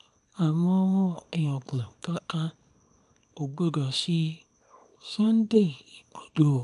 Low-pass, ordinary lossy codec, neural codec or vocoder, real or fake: 10.8 kHz; none; codec, 24 kHz, 0.9 kbps, WavTokenizer, small release; fake